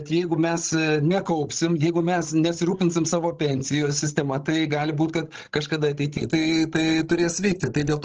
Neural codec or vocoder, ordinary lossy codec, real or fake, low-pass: codec, 16 kHz, 16 kbps, FreqCodec, larger model; Opus, 16 kbps; fake; 7.2 kHz